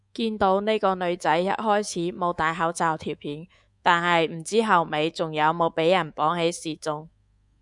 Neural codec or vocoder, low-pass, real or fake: codec, 24 kHz, 3.1 kbps, DualCodec; 10.8 kHz; fake